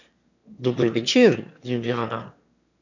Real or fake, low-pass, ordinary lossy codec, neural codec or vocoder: fake; 7.2 kHz; none; autoencoder, 22.05 kHz, a latent of 192 numbers a frame, VITS, trained on one speaker